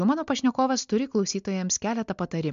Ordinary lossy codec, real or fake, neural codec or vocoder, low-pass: MP3, 64 kbps; real; none; 7.2 kHz